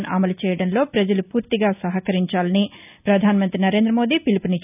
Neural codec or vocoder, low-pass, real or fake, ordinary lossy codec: none; 3.6 kHz; real; none